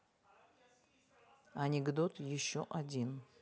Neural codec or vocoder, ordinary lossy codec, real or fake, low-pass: none; none; real; none